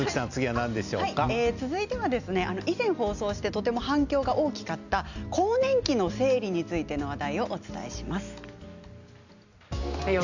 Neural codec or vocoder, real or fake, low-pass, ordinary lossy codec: none; real; 7.2 kHz; none